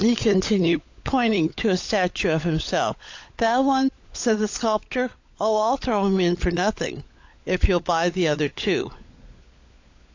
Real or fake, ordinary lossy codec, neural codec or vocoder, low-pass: fake; AAC, 48 kbps; codec, 16 kHz, 16 kbps, FunCodec, trained on LibriTTS, 50 frames a second; 7.2 kHz